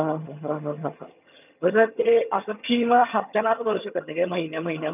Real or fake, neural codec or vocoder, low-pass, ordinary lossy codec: fake; vocoder, 22.05 kHz, 80 mel bands, HiFi-GAN; 3.6 kHz; none